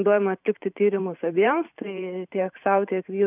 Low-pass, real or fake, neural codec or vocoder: 3.6 kHz; fake; vocoder, 44.1 kHz, 128 mel bands every 512 samples, BigVGAN v2